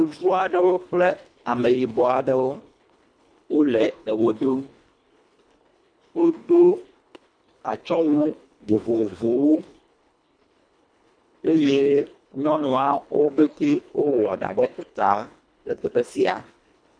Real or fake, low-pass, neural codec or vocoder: fake; 9.9 kHz; codec, 24 kHz, 1.5 kbps, HILCodec